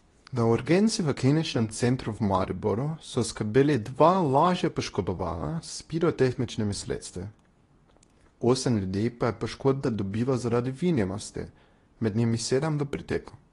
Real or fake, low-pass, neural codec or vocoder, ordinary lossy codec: fake; 10.8 kHz; codec, 24 kHz, 0.9 kbps, WavTokenizer, medium speech release version 2; AAC, 32 kbps